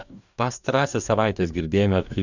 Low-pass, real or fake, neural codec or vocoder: 7.2 kHz; fake; codec, 44.1 kHz, 2.6 kbps, DAC